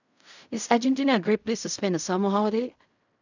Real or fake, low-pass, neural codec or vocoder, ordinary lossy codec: fake; 7.2 kHz; codec, 16 kHz in and 24 kHz out, 0.4 kbps, LongCat-Audio-Codec, fine tuned four codebook decoder; none